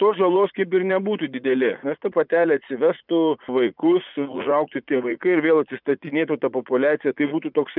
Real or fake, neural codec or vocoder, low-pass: fake; vocoder, 44.1 kHz, 80 mel bands, Vocos; 5.4 kHz